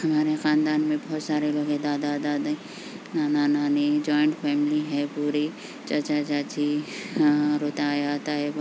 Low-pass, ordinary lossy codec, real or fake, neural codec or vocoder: none; none; real; none